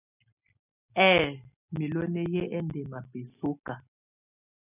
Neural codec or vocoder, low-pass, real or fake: none; 3.6 kHz; real